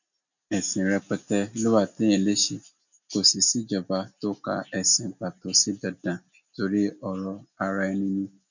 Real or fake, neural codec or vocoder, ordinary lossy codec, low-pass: real; none; none; 7.2 kHz